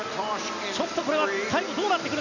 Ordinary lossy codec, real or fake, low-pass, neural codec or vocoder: none; real; 7.2 kHz; none